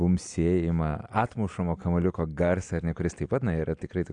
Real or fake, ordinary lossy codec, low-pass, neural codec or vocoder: real; AAC, 64 kbps; 9.9 kHz; none